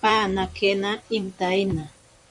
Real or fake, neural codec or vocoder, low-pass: fake; vocoder, 44.1 kHz, 128 mel bands, Pupu-Vocoder; 10.8 kHz